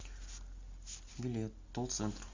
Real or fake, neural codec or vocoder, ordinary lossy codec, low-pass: real; none; MP3, 64 kbps; 7.2 kHz